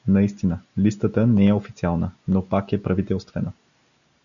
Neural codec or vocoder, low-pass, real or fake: none; 7.2 kHz; real